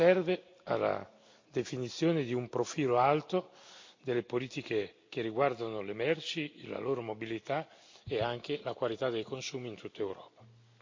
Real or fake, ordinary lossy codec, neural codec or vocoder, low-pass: real; MP3, 48 kbps; none; 7.2 kHz